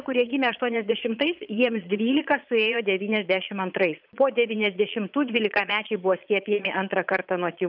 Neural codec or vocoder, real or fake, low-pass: vocoder, 22.05 kHz, 80 mel bands, Vocos; fake; 5.4 kHz